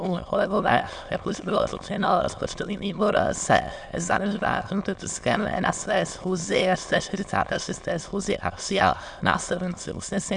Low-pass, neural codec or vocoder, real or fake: 9.9 kHz; autoencoder, 22.05 kHz, a latent of 192 numbers a frame, VITS, trained on many speakers; fake